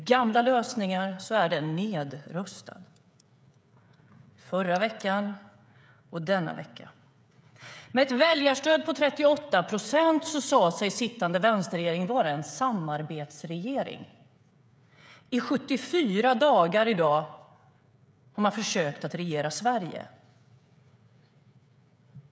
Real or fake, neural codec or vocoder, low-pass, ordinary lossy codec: fake; codec, 16 kHz, 16 kbps, FreqCodec, smaller model; none; none